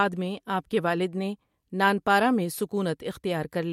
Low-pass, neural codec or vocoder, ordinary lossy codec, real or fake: 19.8 kHz; none; MP3, 64 kbps; real